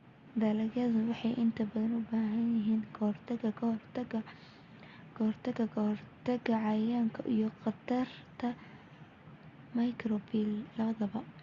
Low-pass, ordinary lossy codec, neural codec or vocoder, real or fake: 7.2 kHz; Opus, 64 kbps; none; real